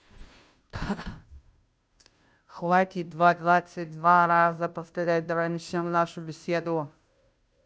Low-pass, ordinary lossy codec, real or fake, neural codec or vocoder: none; none; fake; codec, 16 kHz, 0.5 kbps, FunCodec, trained on Chinese and English, 25 frames a second